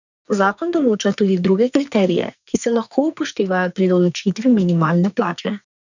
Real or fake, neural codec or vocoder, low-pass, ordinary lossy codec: fake; codec, 44.1 kHz, 2.6 kbps, SNAC; 7.2 kHz; none